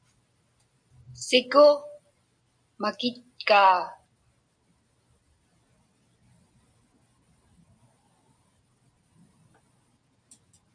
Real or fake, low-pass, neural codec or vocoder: real; 9.9 kHz; none